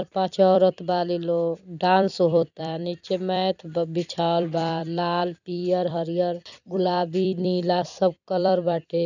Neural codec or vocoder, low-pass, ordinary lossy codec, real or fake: vocoder, 44.1 kHz, 128 mel bands every 256 samples, BigVGAN v2; 7.2 kHz; none; fake